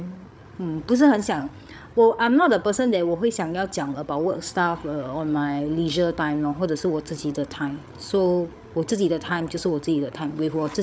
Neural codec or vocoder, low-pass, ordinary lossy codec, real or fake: codec, 16 kHz, 8 kbps, FreqCodec, larger model; none; none; fake